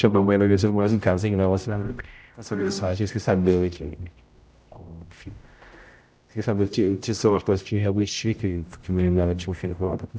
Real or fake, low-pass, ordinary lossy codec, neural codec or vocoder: fake; none; none; codec, 16 kHz, 0.5 kbps, X-Codec, HuBERT features, trained on general audio